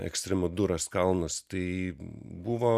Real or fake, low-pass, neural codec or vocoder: real; 14.4 kHz; none